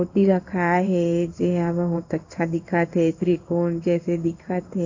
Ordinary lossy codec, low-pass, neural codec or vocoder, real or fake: AAC, 48 kbps; 7.2 kHz; codec, 16 kHz in and 24 kHz out, 1 kbps, XY-Tokenizer; fake